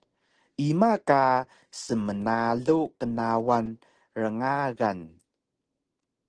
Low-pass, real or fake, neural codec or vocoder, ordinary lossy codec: 9.9 kHz; real; none; Opus, 16 kbps